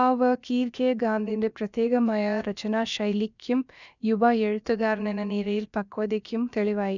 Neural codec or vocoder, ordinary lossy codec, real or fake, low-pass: codec, 16 kHz, about 1 kbps, DyCAST, with the encoder's durations; none; fake; 7.2 kHz